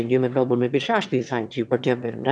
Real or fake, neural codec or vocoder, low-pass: fake; autoencoder, 22.05 kHz, a latent of 192 numbers a frame, VITS, trained on one speaker; 9.9 kHz